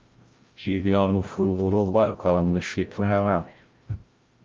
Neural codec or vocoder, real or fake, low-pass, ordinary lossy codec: codec, 16 kHz, 0.5 kbps, FreqCodec, larger model; fake; 7.2 kHz; Opus, 32 kbps